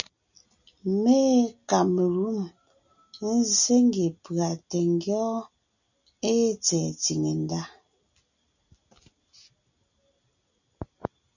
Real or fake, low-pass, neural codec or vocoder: real; 7.2 kHz; none